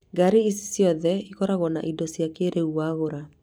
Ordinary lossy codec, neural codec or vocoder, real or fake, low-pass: none; vocoder, 44.1 kHz, 128 mel bands every 256 samples, BigVGAN v2; fake; none